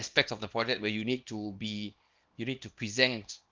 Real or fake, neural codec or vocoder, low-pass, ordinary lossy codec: fake; codec, 24 kHz, 0.9 kbps, WavTokenizer, small release; 7.2 kHz; Opus, 32 kbps